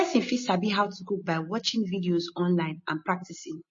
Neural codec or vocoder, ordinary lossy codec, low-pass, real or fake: none; MP3, 32 kbps; 7.2 kHz; real